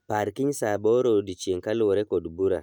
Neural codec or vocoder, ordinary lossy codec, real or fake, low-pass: none; none; real; 19.8 kHz